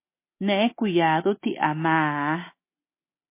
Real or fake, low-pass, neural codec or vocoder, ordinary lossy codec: real; 3.6 kHz; none; MP3, 24 kbps